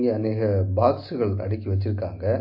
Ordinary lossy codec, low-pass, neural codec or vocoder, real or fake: MP3, 32 kbps; 5.4 kHz; none; real